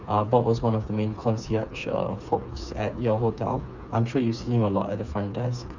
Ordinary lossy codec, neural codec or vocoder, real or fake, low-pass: none; codec, 16 kHz, 4 kbps, FreqCodec, smaller model; fake; 7.2 kHz